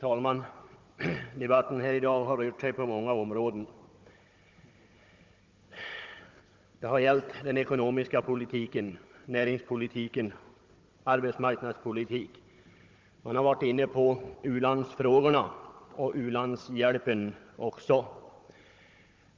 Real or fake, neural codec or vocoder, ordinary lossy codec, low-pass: fake; codec, 16 kHz, 16 kbps, FunCodec, trained on Chinese and English, 50 frames a second; Opus, 32 kbps; 7.2 kHz